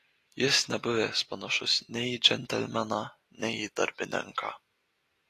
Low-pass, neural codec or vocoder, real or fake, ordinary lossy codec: 14.4 kHz; none; real; AAC, 48 kbps